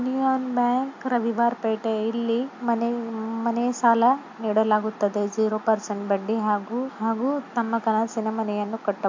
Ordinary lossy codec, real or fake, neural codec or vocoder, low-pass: MP3, 48 kbps; real; none; 7.2 kHz